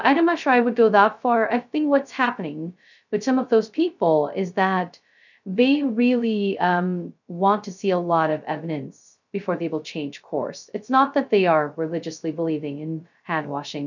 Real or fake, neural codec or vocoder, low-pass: fake; codec, 16 kHz, 0.2 kbps, FocalCodec; 7.2 kHz